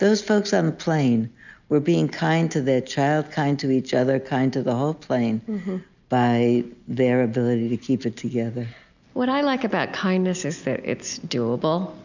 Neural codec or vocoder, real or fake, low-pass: none; real; 7.2 kHz